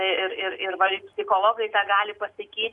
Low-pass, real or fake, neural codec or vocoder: 10.8 kHz; real; none